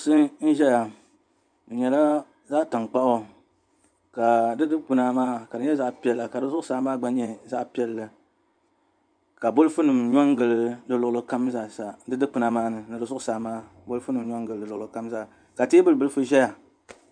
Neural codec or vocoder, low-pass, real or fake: vocoder, 24 kHz, 100 mel bands, Vocos; 9.9 kHz; fake